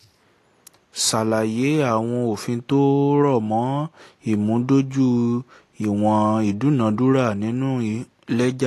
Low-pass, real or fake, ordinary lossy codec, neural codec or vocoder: 14.4 kHz; real; AAC, 48 kbps; none